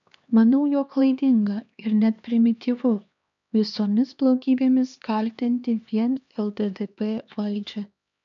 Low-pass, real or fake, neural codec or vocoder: 7.2 kHz; fake; codec, 16 kHz, 2 kbps, X-Codec, HuBERT features, trained on LibriSpeech